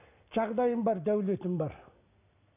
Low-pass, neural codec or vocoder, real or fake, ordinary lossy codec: 3.6 kHz; none; real; none